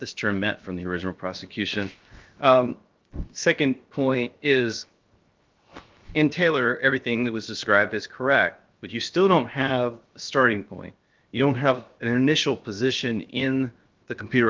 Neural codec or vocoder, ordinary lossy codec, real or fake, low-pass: codec, 16 kHz, 0.7 kbps, FocalCodec; Opus, 32 kbps; fake; 7.2 kHz